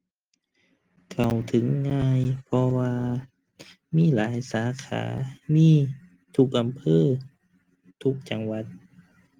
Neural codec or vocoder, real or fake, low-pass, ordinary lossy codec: none; real; 19.8 kHz; Opus, 24 kbps